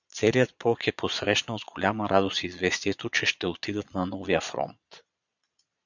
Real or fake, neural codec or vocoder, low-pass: fake; vocoder, 22.05 kHz, 80 mel bands, Vocos; 7.2 kHz